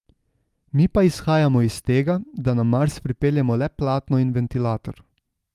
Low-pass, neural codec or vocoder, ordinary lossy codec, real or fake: 14.4 kHz; none; Opus, 32 kbps; real